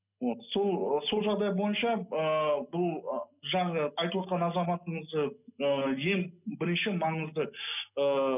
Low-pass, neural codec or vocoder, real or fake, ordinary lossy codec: 3.6 kHz; none; real; none